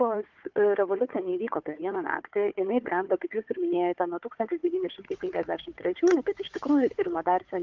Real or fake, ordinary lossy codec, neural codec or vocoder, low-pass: fake; Opus, 24 kbps; codec, 16 kHz, 4 kbps, FunCodec, trained on Chinese and English, 50 frames a second; 7.2 kHz